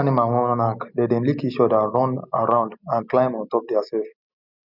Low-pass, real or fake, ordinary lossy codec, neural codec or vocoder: 5.4 kHz; real; none; none